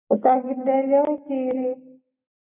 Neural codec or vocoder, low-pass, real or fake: none; 3.6 kHz; real